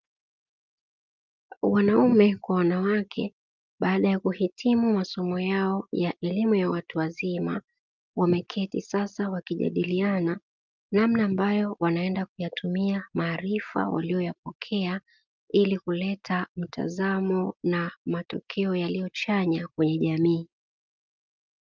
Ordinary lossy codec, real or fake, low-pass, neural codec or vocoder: Opus, 24 kbps; real; 7.2 kHz; none